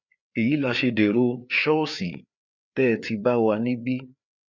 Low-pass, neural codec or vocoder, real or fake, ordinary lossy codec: 7.2 kHz; codec, 16 kHz, 4 kbps, FreqCodec, larger model; fake; none